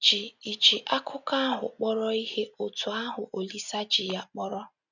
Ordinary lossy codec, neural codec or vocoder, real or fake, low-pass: none; none; real; 7.2 kHz